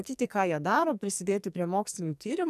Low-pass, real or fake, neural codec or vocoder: 14.4 kHz; fake; codec, 44.1 kHz, 2.6 kbps, SNAC